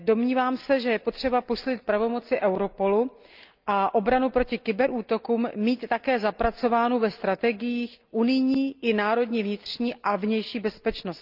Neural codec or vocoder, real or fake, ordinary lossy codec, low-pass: none; real; Opus, 24 kbps; 5.4 kHz